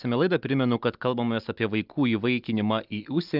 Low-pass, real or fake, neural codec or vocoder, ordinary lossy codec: 5.4 kHz; real; none; Opus, 24 kbps